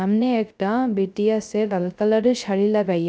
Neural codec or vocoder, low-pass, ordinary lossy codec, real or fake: codec, 16 kHz, 0.3 kbps, FocalCodec; none; none; fake